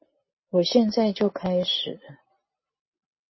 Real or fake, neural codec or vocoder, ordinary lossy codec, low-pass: real; none; MP3, 24 kbps; 7.2 kHz